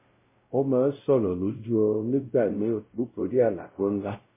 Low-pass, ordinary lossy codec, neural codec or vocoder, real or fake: 3.6 kHz; MP3, 16 kbps; codec, 16 kHz, 0.5 kbps, X-Codec, WavLM features, trained on Multilingual LibriSpeech; fake